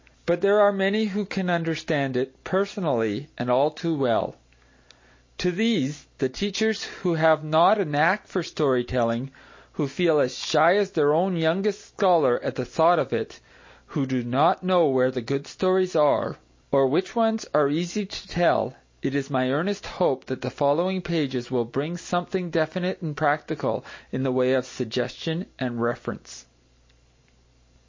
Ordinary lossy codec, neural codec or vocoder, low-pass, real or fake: MP3, 32 kbps; none; 7.2 kHz; real